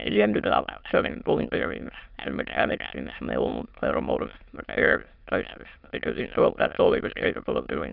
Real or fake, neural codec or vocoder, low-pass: fake; autoencoder, 22.05 kHz, a latent of 192 numbers a frame, VITS, trained on many speakers; 5.4 kHz